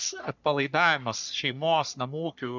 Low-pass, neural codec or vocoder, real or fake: 7.2 kHz; codec, 44.1 kHz, 3.4 kbps, Pupu-Codec; fake